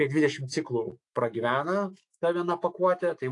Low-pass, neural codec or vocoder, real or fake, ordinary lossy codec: 10.8 kHz; autoencoder, 48 kHz, 128 numbers a frame, DAC-VAE, trained on Japanese speech; fake; AAC, 48 kbps